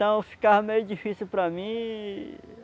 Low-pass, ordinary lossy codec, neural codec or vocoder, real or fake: none; none; none; real